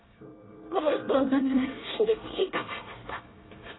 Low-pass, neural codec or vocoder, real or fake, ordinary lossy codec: 7.2 kHz; codec, 24 kHz, 1 kbps, SNAC; fake; AAC, 16 kbps